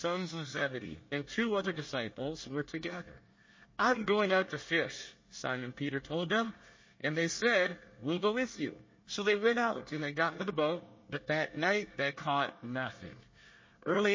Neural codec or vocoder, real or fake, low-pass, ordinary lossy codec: codec, 24 kHz, 1 kbps, SNAC; fake; 7.2 kHz; MP3, 32 kbps